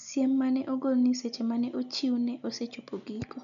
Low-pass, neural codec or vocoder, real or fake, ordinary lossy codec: 7.2 kHz; none; real; none